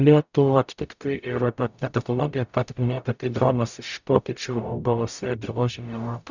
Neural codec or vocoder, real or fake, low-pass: codec, 44.1 kHz, 0.9 kbps, DAC; fake; 7.2 kHz